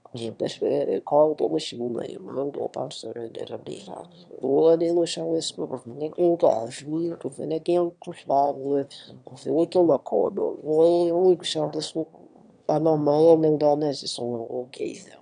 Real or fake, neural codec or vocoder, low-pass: fake; autoencoder, 22.05 kHz, a latent of 192 numbers a frame, VITS, trained on one speaker; 9.9 kHz